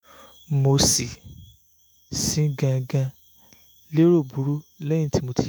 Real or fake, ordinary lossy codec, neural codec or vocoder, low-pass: fake; none; autoencoder, 48 kHz, 128 numbers a frame, DAC-VAE, trained on Japanese speech; none